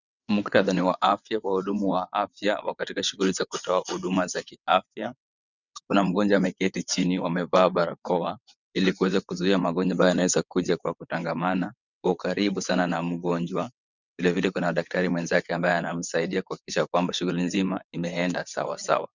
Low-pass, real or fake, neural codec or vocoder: 7.2 kHz; fake; vocoder, 22.05 kHz, 80 mel bands, WaveNeXt